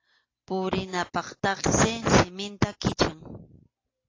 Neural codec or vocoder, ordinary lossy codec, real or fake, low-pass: none; AAC, 32 kbps; real; 7.2 kHz